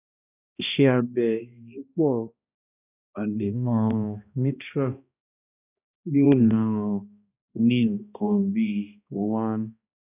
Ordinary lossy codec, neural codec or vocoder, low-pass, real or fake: none; codec, 16 kHz, 1 kbps, X-Codec, HuBERT features, trained on balanced general audio; 3.6 kHz; fake